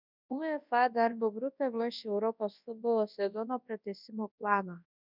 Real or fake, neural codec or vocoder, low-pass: fake; codec, 24 kHz, 0.9 kbps, WavTokenizer, large speech release; 5.4 kHz